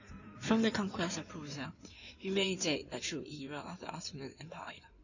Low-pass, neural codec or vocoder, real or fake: 7.2 kHz; codec, 16 kHz in and 24 kHz out, 2.2 kbps, FireRedTTS-2 codec; fake